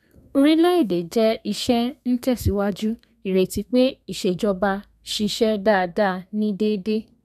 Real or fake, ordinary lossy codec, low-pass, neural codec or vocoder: fake; none; 14.4 kHz; codec, 32 kHz, 1.9 kbps, SNAC